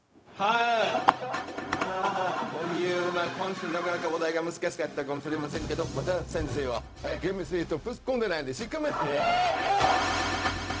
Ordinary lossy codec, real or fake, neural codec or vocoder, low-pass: none; fake; codec, 16 kHz, 0.4 kbps, LongCat-Audio-Codec; none